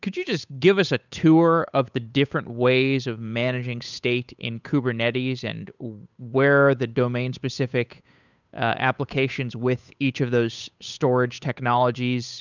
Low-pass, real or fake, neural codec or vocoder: 7.2 kHz; fake; codec, 16 kHz, 8 kbps, FunCodec, trained on Chinese and English, 25 frames a second